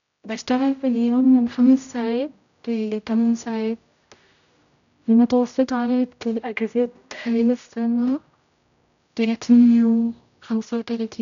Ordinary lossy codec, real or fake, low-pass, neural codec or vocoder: none; fake; 7.2 kHz; codec, 16 kHz, 0.5 kbps, X-Codec, HuBERT features, trained on general audio